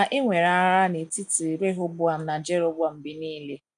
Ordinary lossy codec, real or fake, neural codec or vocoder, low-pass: none; real; none; 9.9 kHz